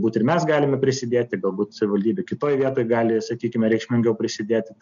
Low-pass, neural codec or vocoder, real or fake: 7.2 kHz; none; real